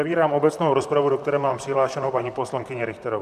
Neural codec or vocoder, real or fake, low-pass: vocoder, 44.1 kHz, 128 mel bands, Pupu-Vocoder; fake; 14.4 kHz